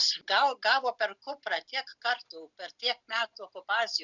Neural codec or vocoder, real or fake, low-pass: none; real; 7.2 kHz